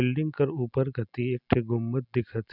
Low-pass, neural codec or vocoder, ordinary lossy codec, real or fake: 5.4 kHz; none; none; real